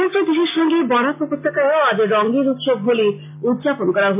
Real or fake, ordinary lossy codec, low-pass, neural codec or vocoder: real; MP3, 24 kbps; 3.6 kHz; none